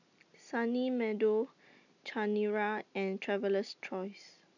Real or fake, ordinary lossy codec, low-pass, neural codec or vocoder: real; none; 7.2 kHz; none